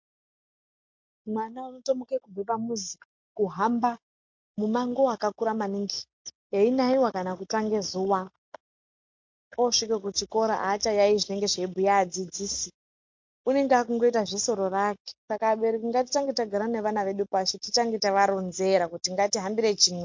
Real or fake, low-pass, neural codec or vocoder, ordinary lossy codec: real; 7.2 kHz; none; MP3, 48 kbps